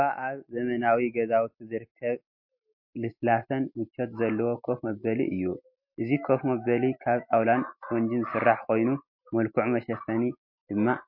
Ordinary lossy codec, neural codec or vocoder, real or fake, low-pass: MP3, 32 kbps; none; real; 5.4 kHz